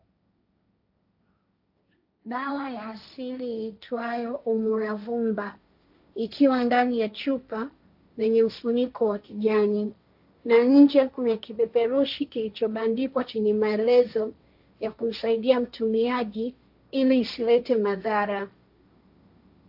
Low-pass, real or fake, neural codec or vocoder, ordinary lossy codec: 5.4 kHz; fake; codec, 16 kHz, 1.1 kbps, Voila-Tokenizer; AAC, 48 kbps